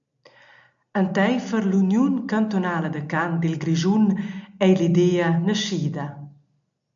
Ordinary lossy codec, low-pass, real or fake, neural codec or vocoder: MP3, 64 kbps; 7.2 kHz; real; none